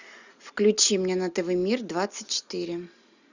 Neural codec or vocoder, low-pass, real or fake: none; 7.2 kHz; real